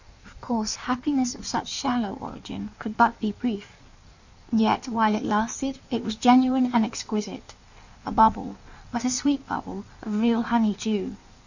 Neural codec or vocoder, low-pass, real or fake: codec, 16 kHz in and 24 kHz out, 1.1 kbps, FireRedTTS-2 codec; 7.2 kHz; fake